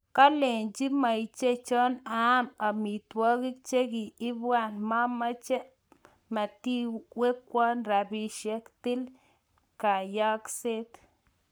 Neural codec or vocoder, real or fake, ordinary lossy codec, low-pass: codec, 44.1 kHz, 7.8 kbps, Pupu-Codec; fake; none; none